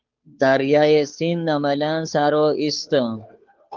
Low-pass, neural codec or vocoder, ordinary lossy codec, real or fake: 7.2 kHz; codec, 16 kHz, 2 kbps, FunCodec, trained on Chinese and English, 25 frames a second; Opus, 32 kbps; fake